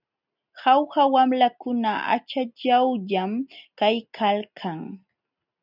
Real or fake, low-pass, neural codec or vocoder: real; 5.4 kHz; none